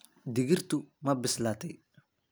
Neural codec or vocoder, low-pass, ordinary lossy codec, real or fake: none; none; none; real